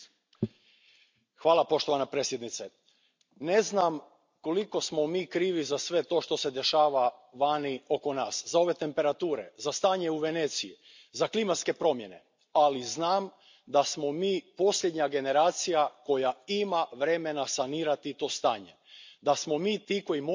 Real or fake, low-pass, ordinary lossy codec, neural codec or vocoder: real; 7.2 kHz; MP3, 64 kbps; none